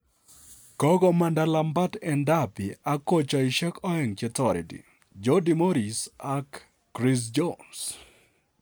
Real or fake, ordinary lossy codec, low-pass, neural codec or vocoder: real; none; none; none